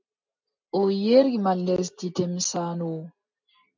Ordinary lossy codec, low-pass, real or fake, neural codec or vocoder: MP3, 64 kbps; 7.2 kHz; real; none